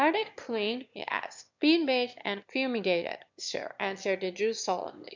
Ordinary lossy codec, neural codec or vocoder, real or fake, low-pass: MP3, 64 kbps; autoencoder, 22.05 kHz, a latent of 192 numbers a frame, VITS, trained on one speaker; fake; 7.2 kHz